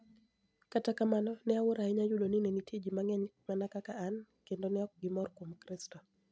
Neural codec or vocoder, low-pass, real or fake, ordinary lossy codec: none; none; real; none